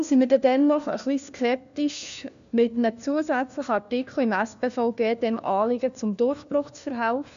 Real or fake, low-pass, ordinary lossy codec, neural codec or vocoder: fake; 7.2 kHz; none; codec, 16 kHz, 1 kbps, FunCodec, trained on LibriTTS, 50 frames a second